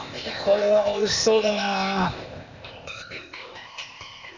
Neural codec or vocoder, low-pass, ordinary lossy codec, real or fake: codec, 16 kHz, 0.8 kbps, ZipCodec; 7.2 kHz; none; fake